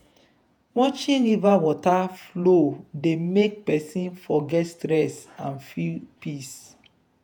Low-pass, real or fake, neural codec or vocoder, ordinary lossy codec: none; fake; vocoder, 48 kHz, 128 mel bands, Vocos; none